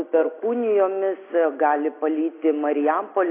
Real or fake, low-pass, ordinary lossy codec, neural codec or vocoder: real; 3.6 kHz; AAC, 24 kbps; none